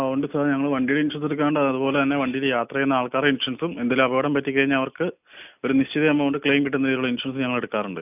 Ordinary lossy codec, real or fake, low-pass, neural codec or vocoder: none; real; 3.6 kHz; none